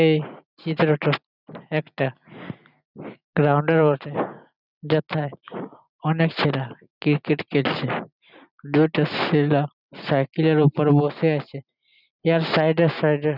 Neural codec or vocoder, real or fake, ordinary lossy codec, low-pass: none; real; none; 5.4 kHz